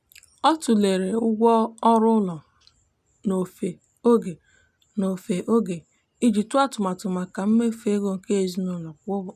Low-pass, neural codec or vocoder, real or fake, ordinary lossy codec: 14.4 kHz; none; real; none